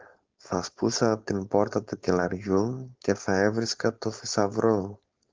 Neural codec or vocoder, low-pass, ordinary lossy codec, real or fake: codec, 16 kHz, 4.8 kbps, FACodec; 7.2 kHz; Opus, 32 kbps; fake